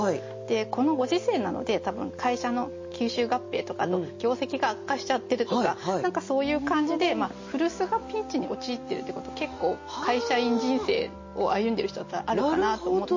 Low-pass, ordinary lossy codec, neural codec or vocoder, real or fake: 7.2 kHz; MP3, 32 kbps; none; real